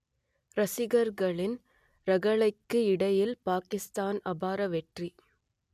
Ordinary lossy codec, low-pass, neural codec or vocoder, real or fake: none; 14.4 kHz; none; real